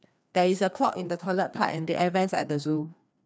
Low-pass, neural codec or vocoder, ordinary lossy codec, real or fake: none; codec, 16 kHz, 2 kbps, FreqCodec, larger model; none; fake